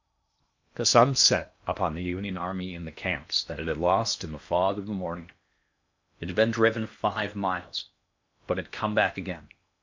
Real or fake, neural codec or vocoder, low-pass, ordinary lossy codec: fake; codec, 16 kHz in and 24 kHz out, 0.8 kbps, FocalCodec, streaming, 65536 codes; 7.2 kHz; MP3, 64 kbps